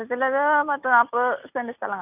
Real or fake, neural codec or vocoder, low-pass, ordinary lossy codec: real; none; 3.6 kHz; none